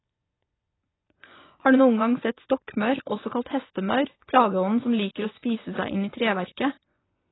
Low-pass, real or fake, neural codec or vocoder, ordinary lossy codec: 7.2 kHz; fake; vocoder, 24 kHz, 100 mel bands, Vocos; AAC, 16 kbps